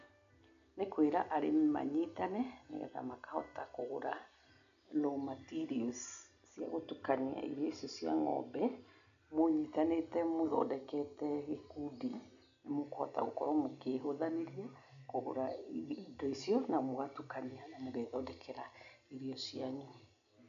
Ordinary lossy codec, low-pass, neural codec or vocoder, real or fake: none; 7.2 kHz; none; real